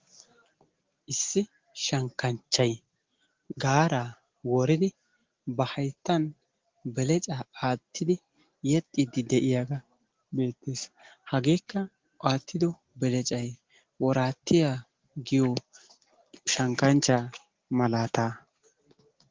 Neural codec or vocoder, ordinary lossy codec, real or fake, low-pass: none; Opus, 16 kbps; real; 7.2 kHz